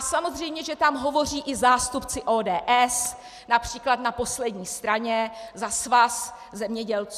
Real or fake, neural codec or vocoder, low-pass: real; none; 14.4 kHz